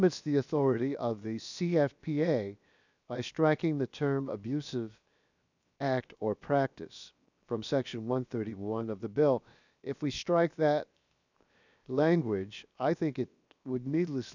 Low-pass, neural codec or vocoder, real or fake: 7.2 kHz; codec, 16 kHz, 0.7 kbps, FocalCodec; fake